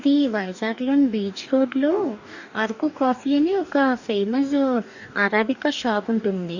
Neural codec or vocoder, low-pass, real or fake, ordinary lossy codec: codec, 44.1 kHz, 2.6 kbps, DAC; 7.2 kHz; fake; none